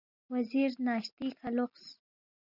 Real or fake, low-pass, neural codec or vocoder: real; 5.4 kHz; none